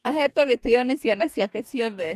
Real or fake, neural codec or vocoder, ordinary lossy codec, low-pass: fake; codec, 44.1 kHz, 2.6 kbps, DAC; none; 14.4 kHz